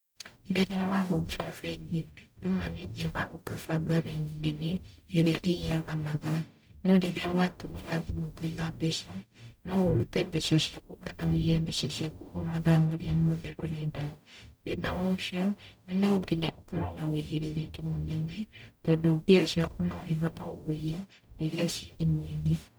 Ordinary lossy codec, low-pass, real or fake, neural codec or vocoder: none; none; fake; codec, 44.1 kHz, 0.9 kbps, DAC